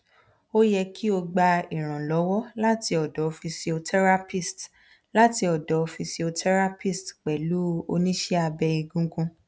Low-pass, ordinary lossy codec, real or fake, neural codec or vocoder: none; none; real; none